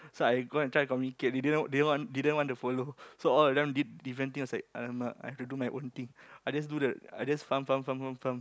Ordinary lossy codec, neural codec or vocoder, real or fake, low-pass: none; none; real; none